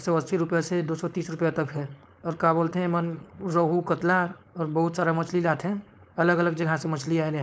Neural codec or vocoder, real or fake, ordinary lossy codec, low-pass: codec, 16 kHz, 4.8 kbps, FACodec; fake; none; none